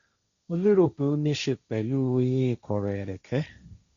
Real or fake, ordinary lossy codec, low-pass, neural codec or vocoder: fake; Opus, 64 kbps; 7.2 kHz; codec, 16 kHz, 1.1 kbps, Voila-Tokenizer